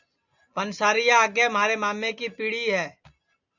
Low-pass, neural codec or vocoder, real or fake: 7.2 kHz; none; real